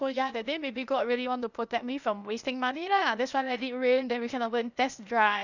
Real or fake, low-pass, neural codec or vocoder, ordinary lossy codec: fake; 7.2 kHz; codec, 16 kHz, 0.8 kbps, ZipCodec; none